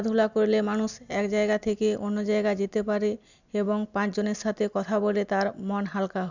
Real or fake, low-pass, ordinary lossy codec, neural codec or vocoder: real; 7.2 kHz; none; none